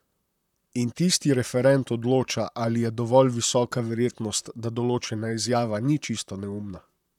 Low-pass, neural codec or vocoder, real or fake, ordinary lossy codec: 19.8 kHz; vocoder, 44.1 kHz, 128 mel bands, Pupu-Vocoder; fake; none